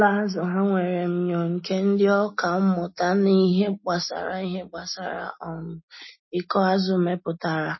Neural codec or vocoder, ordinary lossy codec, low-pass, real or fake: none; MP3, 24 kbps; 7.2 kHz; real